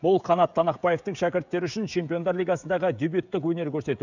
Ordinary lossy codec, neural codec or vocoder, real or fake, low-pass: none; codec, 16 kHz, 16 kbps, FreqCodec, smaller model; fake; 7.2 kHz